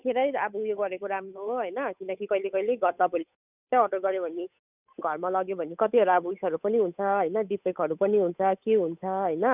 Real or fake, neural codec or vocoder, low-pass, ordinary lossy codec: fake; codec, 16 kHz, 8 kbps, FunCodec, trained on Chinese and English, 25 frames a second; 3.6 kHz; none